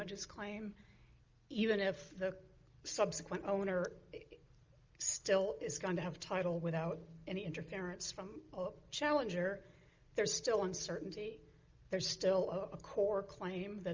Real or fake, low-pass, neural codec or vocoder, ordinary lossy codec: real; 7.2 kHz; none; Opus, 24 kbps